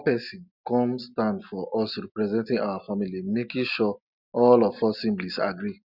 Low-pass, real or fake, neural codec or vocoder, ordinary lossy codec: 5.4 kHz; real; none; none